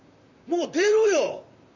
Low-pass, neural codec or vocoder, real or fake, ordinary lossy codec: 7.2 kHz; vocoder, 44.1 kHz, 128 mel bands, Pupu-Vocoder; fake; none